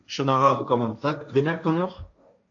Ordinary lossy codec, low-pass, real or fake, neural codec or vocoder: AAC, 48 kbps; 7.2 kHz; fake; codec, 16 kHz, 1.1 kbps, Voila-Tokenizer